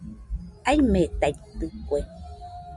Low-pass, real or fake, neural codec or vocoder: 10.8 kHz; real; none